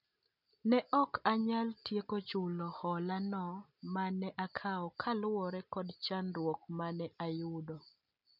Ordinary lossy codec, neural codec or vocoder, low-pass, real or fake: none; none; 5.4 kHz; real